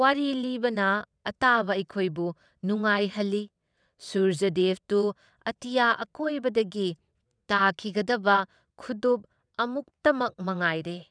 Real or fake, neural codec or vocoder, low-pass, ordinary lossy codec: fake; vocoder, 22.05 kHz, 80 mel bands, WaveNeXt; 9.9 kHz; none